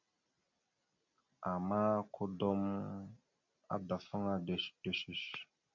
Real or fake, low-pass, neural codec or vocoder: real; 7.2 kHz; none